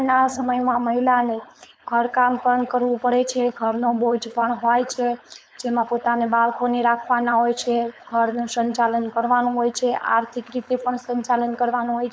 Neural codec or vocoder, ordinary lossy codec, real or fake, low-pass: codec, 16 kHz, 4.8 kbps, FACodec; none; fake; none